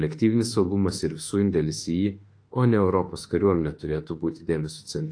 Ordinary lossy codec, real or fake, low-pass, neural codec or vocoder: AAC, 48 kbps; fake; 9.9 kHz; autoencoder, 48 kHz, 32 numbers a frame, DAC-VAE, trained on Japanese speech